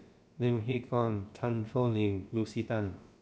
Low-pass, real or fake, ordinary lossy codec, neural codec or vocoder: none; fake; none; codec, 16 kHz, about 1 kbps, DyCAST, with the encoder's durations